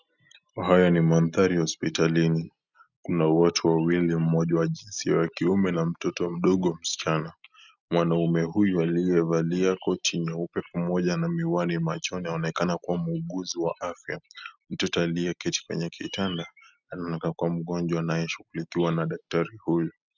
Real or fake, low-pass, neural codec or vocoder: real; 7.2 kHz; none